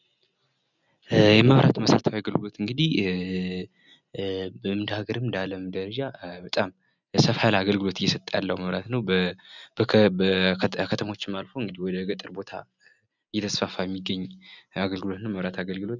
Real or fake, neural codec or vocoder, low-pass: real; none; 7.2 kHz